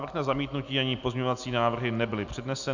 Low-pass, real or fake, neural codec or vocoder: 7.2 kHz; real; none